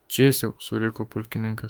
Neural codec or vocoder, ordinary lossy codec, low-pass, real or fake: autoencoder, 48 kHz, 32 numbers a frame, DAC-VAE, trained on Japanese speech; Opus, 32 kbps; 19.8 kHz; fake